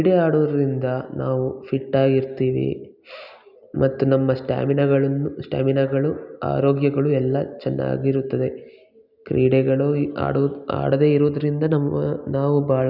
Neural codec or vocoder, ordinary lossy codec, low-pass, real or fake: none; none; 5.4 kHz; real